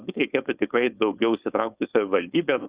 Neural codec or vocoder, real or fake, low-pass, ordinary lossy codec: codec, 16 kHz, 4.8 kbps, FACodec; fake; 3.6 kHz; Opus, 64 kbps